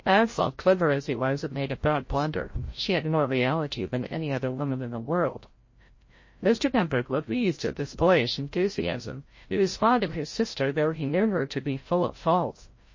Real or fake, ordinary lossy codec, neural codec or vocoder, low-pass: fake; MP3, 32 kbps; codec, 16 kHz, 0.5 kbps, FreqCodec, larger model; 7.2 kHz